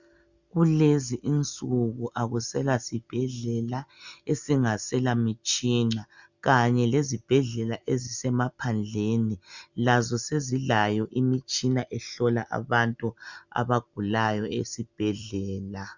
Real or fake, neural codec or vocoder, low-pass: real; none; 7.2 kHz